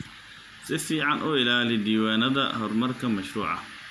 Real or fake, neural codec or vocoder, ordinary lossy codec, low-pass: real; none; none; 14.4 kHz